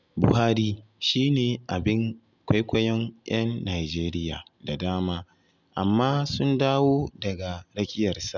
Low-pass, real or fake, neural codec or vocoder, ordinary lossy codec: 7.2 kHz; real; none; none